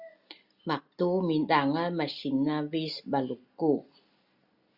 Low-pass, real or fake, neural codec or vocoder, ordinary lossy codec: 5.4 kHz; real; none; Opus, 64 kbps